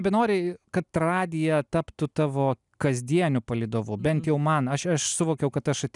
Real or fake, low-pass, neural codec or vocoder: real; 10.8 kHz; none